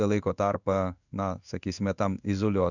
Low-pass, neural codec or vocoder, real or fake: 7.2 kHz; codec, 16 kHz in and 24 kHz out, 1 kbps, XY-Tokenizer; fake